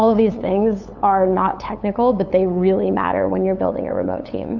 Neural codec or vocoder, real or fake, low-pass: codec, 16 kHz, 8 kbps, FunCodec, trained on LibriTTS, 25 frames a second; fake; 7.2 kHz